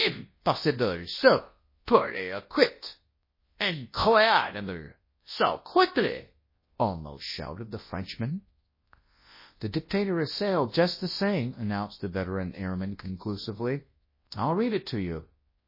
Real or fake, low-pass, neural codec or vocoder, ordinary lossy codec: fake; 5.4 kHz; codec, 24 kHz, 0.9 kbps, WavTokenizer, large speech release; MP3, 24 kbps